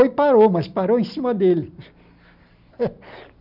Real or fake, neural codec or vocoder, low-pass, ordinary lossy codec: real; none; 5.4 kHz; none